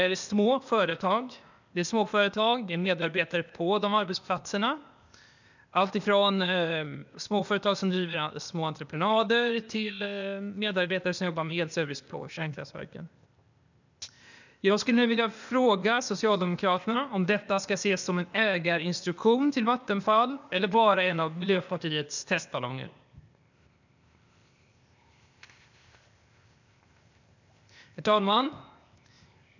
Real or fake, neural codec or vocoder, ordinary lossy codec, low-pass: fake; codec, 16 kHz, 0.8 kbps, ZipCodec; none; 7.2 kHz